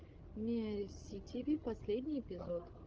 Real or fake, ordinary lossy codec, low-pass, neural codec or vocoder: fake; Opus, 16 kbps; 7.2 kHz; codec, 16 kHz, 16 kbps, FreqCodec, larger model